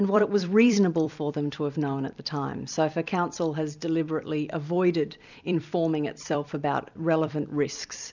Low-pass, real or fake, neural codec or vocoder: 7.2 kHz; fake; vocoder, 44.1 kHz, 128 mel bands every 256 samples, BigVGAN v2